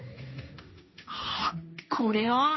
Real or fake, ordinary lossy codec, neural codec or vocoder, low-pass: fake; MP3, 24 kbps; codec, 16 kHz, 1.1 kbps, Voila-Tokenizer; 7.2 kHz